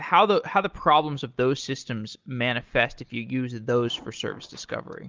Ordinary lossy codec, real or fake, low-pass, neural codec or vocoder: Opus, 32 kbps; real; 7.2 kHz; none